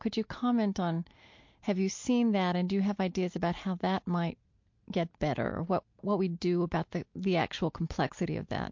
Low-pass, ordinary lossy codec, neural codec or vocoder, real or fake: 7.2 kHz; MP3, 48 kbps; none; real